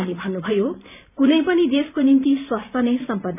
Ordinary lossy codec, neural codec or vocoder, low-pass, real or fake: none; vocoder, 44.1 kHz, 128 mel bands every 512 samples, BigVGAN v2; 3.6 kHz; fake